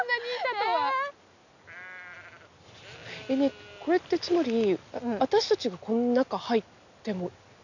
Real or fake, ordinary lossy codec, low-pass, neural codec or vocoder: real; none; 7.2 kHz; none